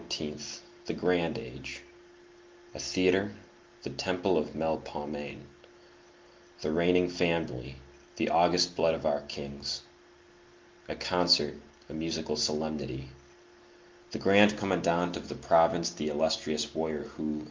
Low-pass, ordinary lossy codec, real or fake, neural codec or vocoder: 7.2 kHz; Opus, 32 kbps; real; none